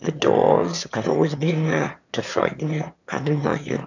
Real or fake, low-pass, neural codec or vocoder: fake; 7.2 kHz; autoencoder, 22.05 kHz, a latent of 192 numbers a frame, VITS, trained on one speaker